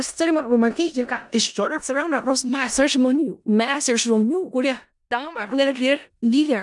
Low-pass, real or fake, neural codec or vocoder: 10.8 kHz; fake; codec, 16 kHz in and 24 kHz out, 0.4 kbps, LongCat-Audio-Codec, four codebook decoder